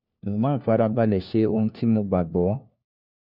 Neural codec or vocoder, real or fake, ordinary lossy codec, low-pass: codec, 16 kHz, 1 kbps, FunCodec, trained on LibriTTS, 50 frames a second; fake; none; 5.4 kHz